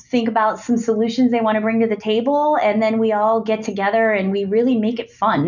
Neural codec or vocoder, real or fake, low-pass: none; real; 7.2 kHz